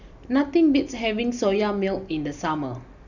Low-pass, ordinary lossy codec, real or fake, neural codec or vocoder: 7.2 kHz; none; real; none